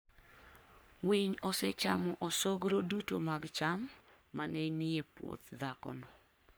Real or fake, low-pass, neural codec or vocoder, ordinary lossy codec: fake; none; codec, 44.1 kHz, 3.4 kbps, Pupu-Codec; none